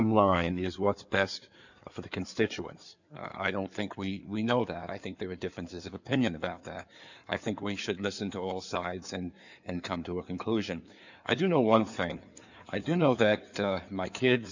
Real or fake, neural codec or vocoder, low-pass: fake; codec, 16 kHz in and 24 kHz out, 2.2 kbps, FireRedTTS-2 codec; 7.2 kHz